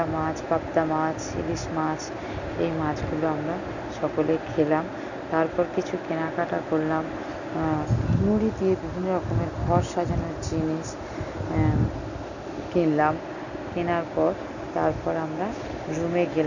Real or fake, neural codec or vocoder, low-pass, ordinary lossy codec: real; none; 7.2 kHz; none